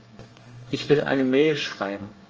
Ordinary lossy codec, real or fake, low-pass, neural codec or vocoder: Opus, 24 kbps; fake; 7.2 kHz; codec, 24 kHz, 1 kbps, SNAC